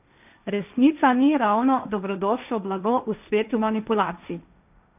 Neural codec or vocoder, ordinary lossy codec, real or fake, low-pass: codec, 16 kHz, 1.1 kbps, Voila-Tokenizer; none; fake; 3.6 kHz